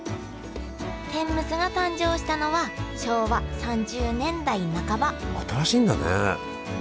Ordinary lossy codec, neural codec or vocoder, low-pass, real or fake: none; none; none; real